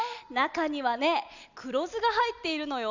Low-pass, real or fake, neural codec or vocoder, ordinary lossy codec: 7.2 kHz; real; none; none